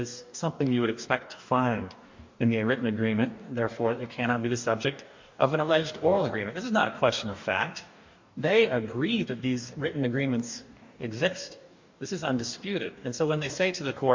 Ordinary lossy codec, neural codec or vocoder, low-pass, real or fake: MP3, 48 kbps; codec, 44.1 kHz, 2.6 kbps, DAC; 7.2 kHz; fake